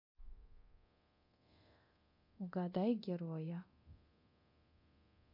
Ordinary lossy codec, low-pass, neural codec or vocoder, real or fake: MP3, 32 kbps; 5.4 kHz; codec, 24 kHz, 0.9 kbps, DualCodec; fake